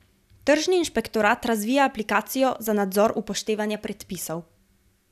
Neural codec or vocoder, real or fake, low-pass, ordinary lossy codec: none; real; 14.4 kHz; none